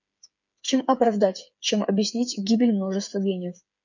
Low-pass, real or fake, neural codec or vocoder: 7.2 kHz; fake; codec, 16 kHz, 8 kbps, FreqCodec, smaller model